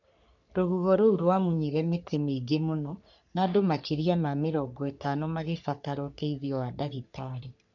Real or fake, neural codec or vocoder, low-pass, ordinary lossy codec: fake; codec, 44.1 kHz, 3.4 kbps, Pupu-Codec; 7.2 kHz; none